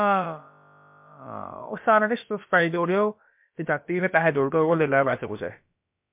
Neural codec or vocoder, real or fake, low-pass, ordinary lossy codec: codec, 16 kHz, about 1 kbps, DyCAST, with the encoder's durations; fake; 3.6 kHz; MP3, 32 kbps